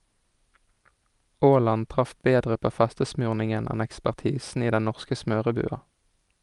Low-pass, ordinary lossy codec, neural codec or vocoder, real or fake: 10.8 kHz; Opus, 32 kbps; none; real